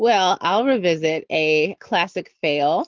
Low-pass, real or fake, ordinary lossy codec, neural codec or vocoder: 7.2 kHz; real; Opus, 32 kbps; none